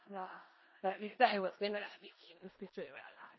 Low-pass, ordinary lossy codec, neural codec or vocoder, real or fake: 7.2 kHz; MP3, 24 kbps; codec, 16 kHz in and 24 kHz out, 0.4 kbps, LongCat-Audio-Codec, four codebook decoder; fake